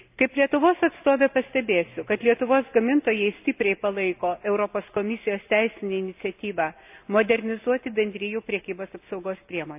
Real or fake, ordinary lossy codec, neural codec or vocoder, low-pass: real; AAC, 32 kbps; none; 3.6 kHz